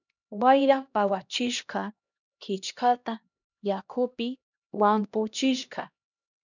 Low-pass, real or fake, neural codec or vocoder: 7.2 kHz; fake; codec, 16 kHz, 1 kbps, X-Codec, HuBERT features, trained on LibriSpeech